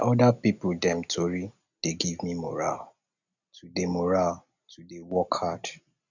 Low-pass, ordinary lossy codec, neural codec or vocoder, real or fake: 7.2 kHz; none; none; real